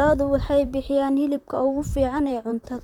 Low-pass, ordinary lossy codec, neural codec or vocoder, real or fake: 19.8 kHz; none; vocoder, 44.1 kHz, 128 mel bands, Pupu-Vocoder; fake